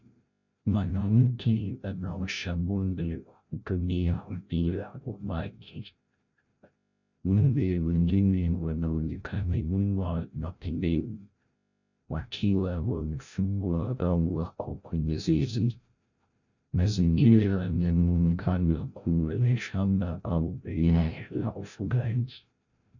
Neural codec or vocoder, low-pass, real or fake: codec, 16 kHz, 0.5 kbps, FreqCodec, larger model; 7.2 kHz; fake